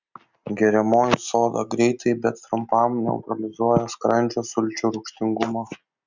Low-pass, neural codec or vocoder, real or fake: 7.2 kHz; none; real